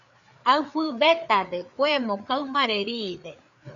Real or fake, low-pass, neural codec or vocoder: fake; 7.2 kHz; codec, 16 kHz, 4 kbps, FreqCodec, larger model